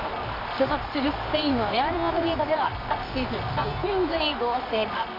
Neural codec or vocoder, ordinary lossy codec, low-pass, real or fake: codec, 24 kHz, 0.9 kbps, WavTokenizer, medium music audio release; AAC, 32 kbps; 5.4 kHz; fake